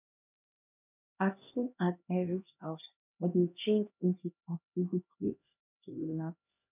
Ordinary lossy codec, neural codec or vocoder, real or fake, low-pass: none; codec, 16 kHz, 1 kbps, X-Codec, HuBERT features, trained on LibriSpeech; fake; 3.6 kHz